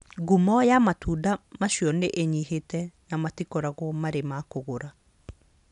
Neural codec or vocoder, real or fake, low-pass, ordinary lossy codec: none; real; 10.8 kHz; none